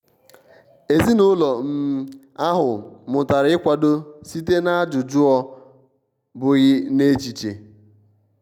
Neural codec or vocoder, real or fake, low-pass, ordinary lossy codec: none; real; 19.8 kHz; none